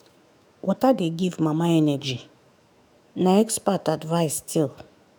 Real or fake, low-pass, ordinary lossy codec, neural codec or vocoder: fake; none; none; autoencoder, 48 kHz, 128 numbers a frame, DAC-VAE, trained on Japanese speech